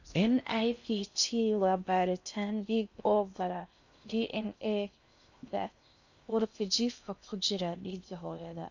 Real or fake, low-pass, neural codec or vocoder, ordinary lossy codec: fake; 7.2 kHz; codec, 16 kHz in and 24 kHz out, 0.6 kbps, FocalCodec, streaming, 4096 codes; none